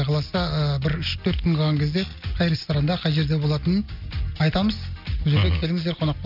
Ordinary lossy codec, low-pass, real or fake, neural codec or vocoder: AAC, 48 kbps; 5.4 kHz; real; none